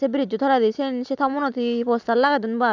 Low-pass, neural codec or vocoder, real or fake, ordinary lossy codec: 7.2 kHz; none; real; none